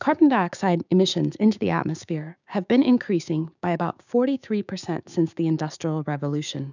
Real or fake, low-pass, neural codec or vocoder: fake; 7.2 kHz; codec, 16 kHz, 6 kbps, DAC